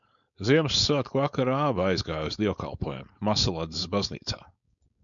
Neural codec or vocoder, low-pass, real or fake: codec, 16 kHz, 4.8 kbps, FACodec; 7.2 kHz; fake